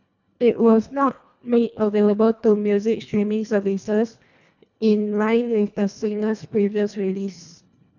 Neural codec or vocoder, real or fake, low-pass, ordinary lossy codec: codec, 24 kHz, 1.5 kbps, HILCodec; fake; 7.2 kHz; none